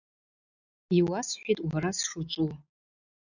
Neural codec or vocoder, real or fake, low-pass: none; real; 7.2 kHz